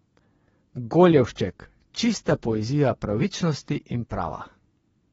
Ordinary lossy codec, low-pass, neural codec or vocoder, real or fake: AAC, 24 kbps; 10.8 kHz; vocoder, 24 kHz, 100 mel bands, Vocos; fake